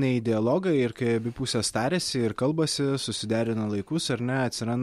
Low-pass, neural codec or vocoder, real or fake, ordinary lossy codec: 19.8 kHz; none; real; MP3, 64 kbps